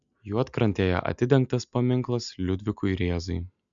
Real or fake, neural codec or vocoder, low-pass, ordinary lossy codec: real; none; 7.2 kHz; MP3, 96 kbps